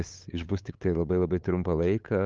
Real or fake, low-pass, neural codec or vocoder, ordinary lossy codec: fake; 7.2 kHz; codec, 16 kHz, 8 kbps, FunCodec, trained on LibriTTS, 25 frames a second; Opus, 24 kbps